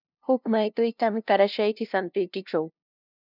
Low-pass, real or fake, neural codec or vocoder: 5.4 kHz; fake; codec, 16 kHz, 0.5 kbps, FunCodec, trained on LibriTTS, 25 frames a second